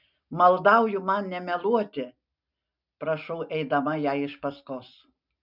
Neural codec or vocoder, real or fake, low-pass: none; real; 5.4 kHz